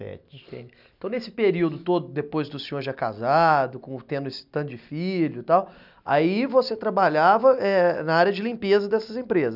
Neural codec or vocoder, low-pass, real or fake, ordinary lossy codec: none; 5.4 kHz; real; none